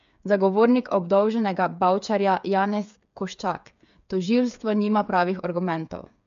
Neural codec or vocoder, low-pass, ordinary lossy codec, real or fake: codec, 16 kHz, 8 kbps, FreqCodec, smaller model; 7.2 kHz; MP3, 64 kbps; fake